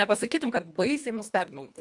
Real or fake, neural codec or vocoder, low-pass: fake; codec, 24 kHz, 1.5 kbps, HILCodec; 10.8 kHz